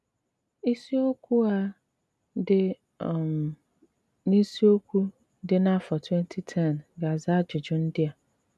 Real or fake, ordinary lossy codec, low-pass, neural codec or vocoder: real; none; none; none